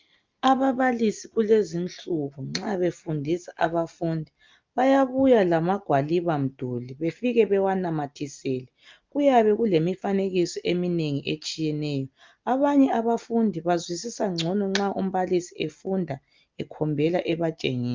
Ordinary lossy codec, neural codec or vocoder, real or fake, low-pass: Opus, 24 kbps; none; real; 7.2 kHz